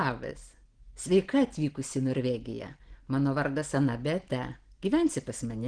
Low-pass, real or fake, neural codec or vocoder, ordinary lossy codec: 9.9 kHz; real; none; Opus, 16 kbps